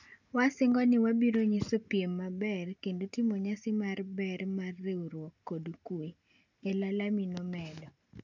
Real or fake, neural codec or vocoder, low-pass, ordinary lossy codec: real; none; 7.2 kHz; none